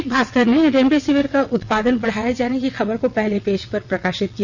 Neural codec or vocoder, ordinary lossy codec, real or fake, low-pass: vocoder, 22.05 kHz, 80 mel bands, WaveNeXt; none; fake; 7.2 kHz